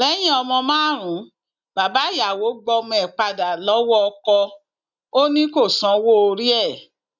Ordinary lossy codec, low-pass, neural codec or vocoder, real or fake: none; 7.2 kHz; none; real